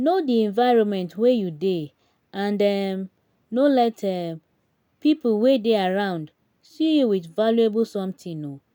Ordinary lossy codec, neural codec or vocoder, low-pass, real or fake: none; none; 19.8 kHz; real